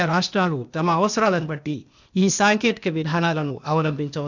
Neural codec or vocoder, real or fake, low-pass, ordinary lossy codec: codec, 16 kHz, 0.8 kbps, ZipCodec; fake; 7.2 kHz; none